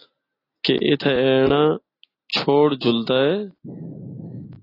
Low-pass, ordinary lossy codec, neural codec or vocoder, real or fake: 5.4 kHz; AAC, 24 kbps; none; real